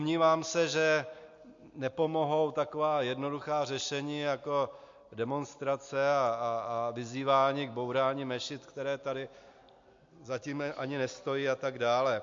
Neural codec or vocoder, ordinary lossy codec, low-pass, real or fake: none; MP3, 48 kbps; 7.2 kHz; real